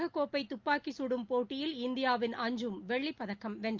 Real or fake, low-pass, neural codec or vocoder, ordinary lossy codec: real; 7.2 kHz; none; Opus, 32 kbps